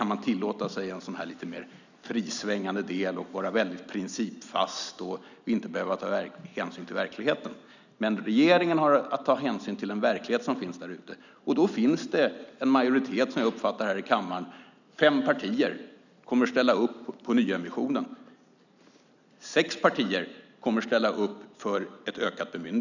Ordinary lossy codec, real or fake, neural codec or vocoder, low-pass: none; real; none; 7.2 kHz